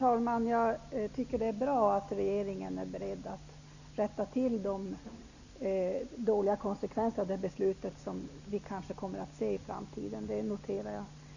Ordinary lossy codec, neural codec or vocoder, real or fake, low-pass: none; none; real; 7.2 kHz